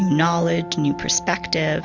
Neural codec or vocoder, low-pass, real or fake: none; 7.2 kHz; real